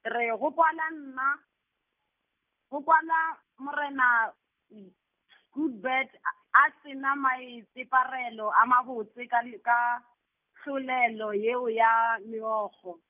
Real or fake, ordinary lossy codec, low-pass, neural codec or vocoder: real; none; 3.6 kHz; none